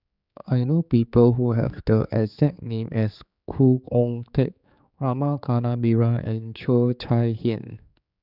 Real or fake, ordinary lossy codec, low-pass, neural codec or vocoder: fake; none; 5.4 kHz; codec, 16 kHz, 4 kbps, X-Codec, HuBERT features, trained on general audio